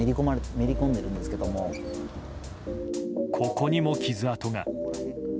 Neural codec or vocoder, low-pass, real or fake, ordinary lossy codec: none; none; real; none